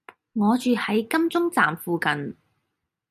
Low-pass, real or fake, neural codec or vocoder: 14.4 kHz; real; none